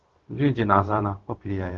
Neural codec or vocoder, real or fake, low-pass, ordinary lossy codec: codec, 16 kHz, 0.4 kbps, LongCat-Audio-Codec; fake; 7.2 kHz; Opus, 16 kbps